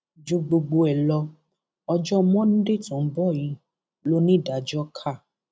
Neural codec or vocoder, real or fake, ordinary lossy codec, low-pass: none; real; none; none